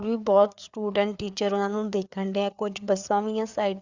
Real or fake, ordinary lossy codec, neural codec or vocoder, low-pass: fake; Opus, 64 kbps; codec, 16 kHz, 4 kbps, FreqCodec, larger model; 7.2 kHz